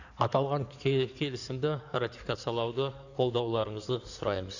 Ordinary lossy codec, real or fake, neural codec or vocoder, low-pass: none; fake; codec, 16 kHz in and 24 kHz out, 2.2 kbps, FireRedTTS-2 codec; 7.2 kHz